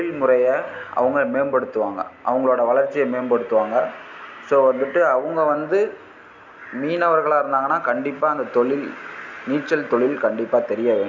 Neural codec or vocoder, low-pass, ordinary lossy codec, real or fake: none; 7.2 kHz; none; real